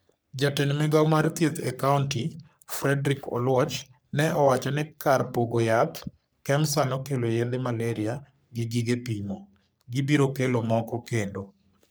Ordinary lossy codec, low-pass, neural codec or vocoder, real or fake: none; none; codec, 44.1 kHz, 3.4 kbps, Pupu-Codec; fake